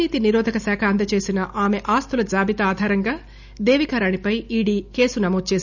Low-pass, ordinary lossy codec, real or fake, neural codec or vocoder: 7.2 kHz; none; real; none